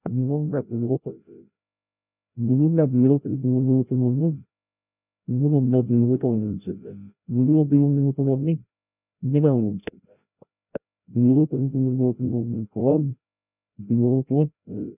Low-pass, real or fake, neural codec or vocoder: 3.6 kHz; fake; codec, 16 kHz, 0.5 kbps, FreqCodec, larger model